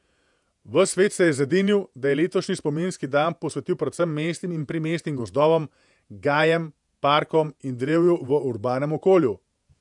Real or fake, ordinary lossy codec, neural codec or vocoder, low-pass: fake; none; vocoder, 48 kHz, 128 mel bands, Vocos; 10.8 kHz